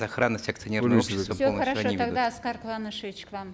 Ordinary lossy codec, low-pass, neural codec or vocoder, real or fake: none; none; none; real